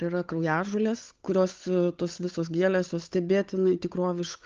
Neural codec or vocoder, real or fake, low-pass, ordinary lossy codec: codec, 16 kHz, 8 kbps, FunCodec, trained on Chinese and English, 25 frames a second; fake; 7.2 kHz; Opus, 32 kbps